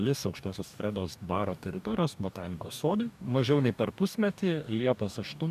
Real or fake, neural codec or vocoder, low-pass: fake; codec, 44.1 kHz, 2.6 kbps, DAC; 14.4 kHz